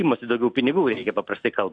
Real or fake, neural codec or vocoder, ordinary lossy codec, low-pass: real; none; AAC, 64 kbps; 9.9 kHz